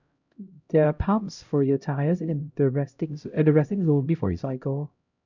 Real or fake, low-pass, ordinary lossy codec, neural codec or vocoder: fake; 7.2 kHz; none; codec, 16 kHz, 0.5 kbps, X-Codec, HuBERT features, trained on LibriSpeech